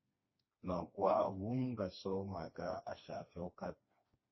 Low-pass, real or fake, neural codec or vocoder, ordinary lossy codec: 7.2 kHz; fake; codec, 16 kHz, 2 kbps, FreqCodec, smaller model; MP3, 24 kbps